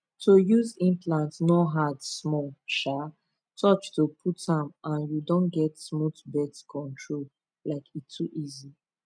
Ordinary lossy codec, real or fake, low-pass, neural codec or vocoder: none; real; 9.9 kHz; none